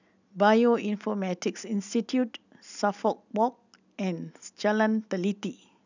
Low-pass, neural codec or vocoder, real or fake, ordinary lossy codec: 7.2 kHz; none; real; none